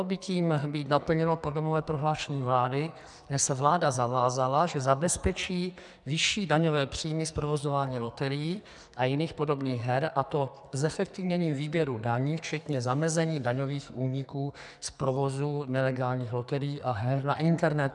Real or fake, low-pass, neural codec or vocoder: fake; 10.8 kHz; codec, 44.1 kHz, 2.6 kbps, SNAC